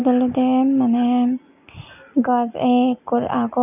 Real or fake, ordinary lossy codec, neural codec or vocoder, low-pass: real; none; none; 3.6 kHz